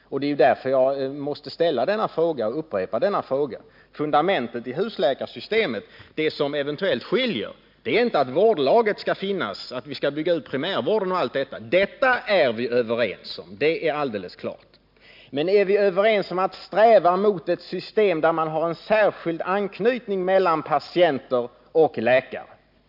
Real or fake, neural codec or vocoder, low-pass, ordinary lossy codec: real; none; 5.4 kHz; MP3, 48 kbps